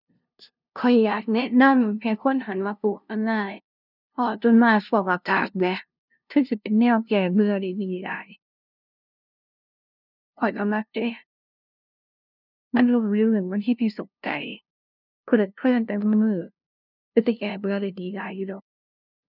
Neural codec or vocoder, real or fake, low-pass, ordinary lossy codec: codec, 16 kHz, 0.5 kbps, FunCodec, trained on LibriTTS, 25 frames a second; fake; 5.4 kHz; none